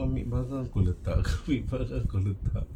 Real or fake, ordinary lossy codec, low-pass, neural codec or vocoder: real; MP3, 96 kbps; 19.8 kHz; none